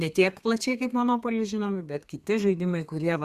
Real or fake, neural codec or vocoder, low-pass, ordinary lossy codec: fake; codec, 32 kHz, 1.9 kbps, SNAC; 14.4 kHz; Opus, 64 kbps